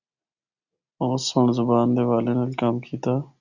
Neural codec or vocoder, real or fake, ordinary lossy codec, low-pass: none; real; Opus, 64 kbps; 7.2 kHz